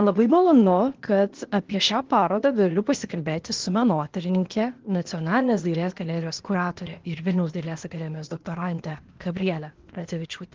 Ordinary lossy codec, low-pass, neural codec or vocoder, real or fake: Opus, 16 kbps; 7.2 kHz; codec, 16 kHz, 0.8 kbps, ZipCodec; fake